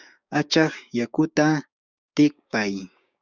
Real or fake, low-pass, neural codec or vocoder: fake; 7.2 kHz; codec, 16 kHz, 6 kbps, DAC